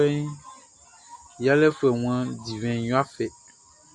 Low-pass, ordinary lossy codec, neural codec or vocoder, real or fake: 10.8 kHz; Opus, 64 kbps; none; real